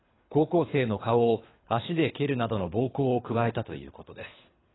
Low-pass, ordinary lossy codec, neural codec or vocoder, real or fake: 7.2 kHz; AAC, 16 kbps; codec, 24 kHz, 3 kbps, HILCodec; fake